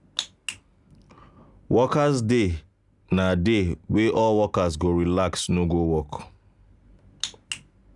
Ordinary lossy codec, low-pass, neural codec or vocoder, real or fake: none; 10.8 kHz; none; real